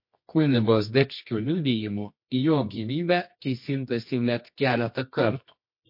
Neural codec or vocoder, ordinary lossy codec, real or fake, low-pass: codec, 24 kHz, 0.9 kbps, WavTokenizer, medium music audio release; MP3, 32 kbps; fake; 5.4 kHz